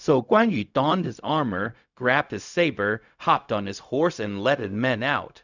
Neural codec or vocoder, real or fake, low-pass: codec, 16 kHz, 0.4 kbps, LongCat-Audio-Codec; fake; 7.2 kHz